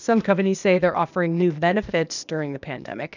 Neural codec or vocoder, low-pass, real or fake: codec, 16 kHz, 0.8 kbps, ZipCodec; 7.2 kHz; fake